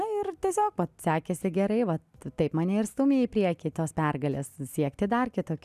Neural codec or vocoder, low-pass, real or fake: none; 14.4 kHz; real